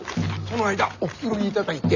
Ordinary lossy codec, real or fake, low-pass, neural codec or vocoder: none; real; 7.2 kHz; none